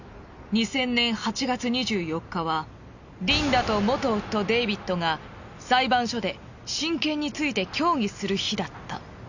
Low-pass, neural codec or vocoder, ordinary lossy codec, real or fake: 7.2 kHz; none; none; real